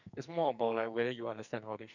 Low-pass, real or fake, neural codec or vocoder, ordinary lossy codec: 7.2 kHz; fake; codec, 44.1 kHz, 2.6 kbps, SNAC; none